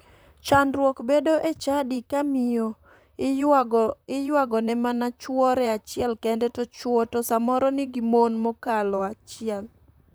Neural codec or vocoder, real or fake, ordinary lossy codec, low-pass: vocoder, 44.1 kHz, 128 mel bands, Pupu-Vocoder; fake; none; none